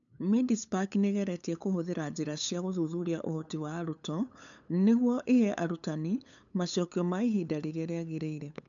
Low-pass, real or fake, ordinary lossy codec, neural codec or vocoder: 7.2 kHz; fake; none; codec, 16 kHz, 8 kbps, FunCodec, trained on LibriTTS, 25 frames a second